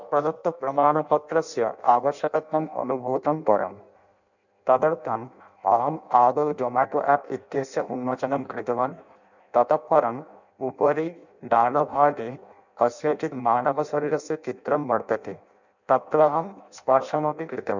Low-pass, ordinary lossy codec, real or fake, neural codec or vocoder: 7.2 kHz; none; fake; codec, 16 kHz in and 24 kHz out, 0.6 kbps, FireRedTTS-2 codec